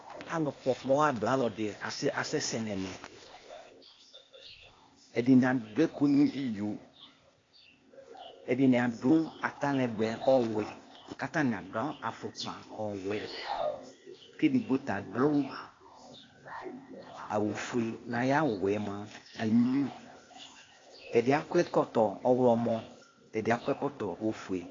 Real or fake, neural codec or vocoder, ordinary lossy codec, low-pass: fake; codec, 16 kHz, 0.8 kbps, ZipCodec; AAC, 32 kbps; 7.2 kHz